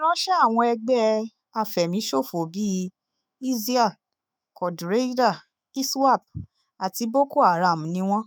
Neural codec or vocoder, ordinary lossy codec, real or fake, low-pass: autoencoder, 48 kHz, 128 numbers a frame, DAC-VAE, trained on Japanese speech; none; fake; none